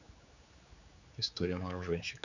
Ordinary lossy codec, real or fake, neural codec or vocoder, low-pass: none; fake; codec, 16 kHz, 4 kbps, X-Codec, HuBERT features, trained on general audio; 7.2 kHz